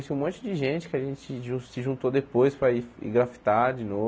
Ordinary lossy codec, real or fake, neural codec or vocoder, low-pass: none; real; none; none